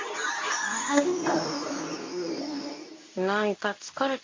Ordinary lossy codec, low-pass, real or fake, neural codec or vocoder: MP3, 48 kbps; 7.2 kHz; fake; codec, 24 kHz, 0.9 kbps, WavTokenizer, medium speech release version 2